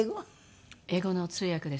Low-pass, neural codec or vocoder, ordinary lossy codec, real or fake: none; none; none; real